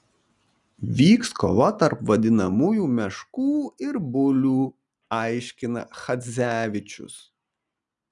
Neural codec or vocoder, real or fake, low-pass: none; real; 10.8 kHz